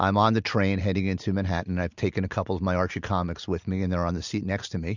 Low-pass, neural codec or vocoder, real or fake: 7.2 kHz; none; real